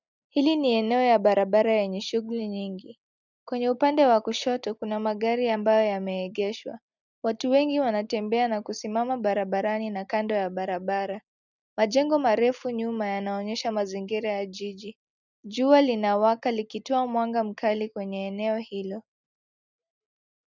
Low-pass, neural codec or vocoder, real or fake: 7.2 kHz; none; real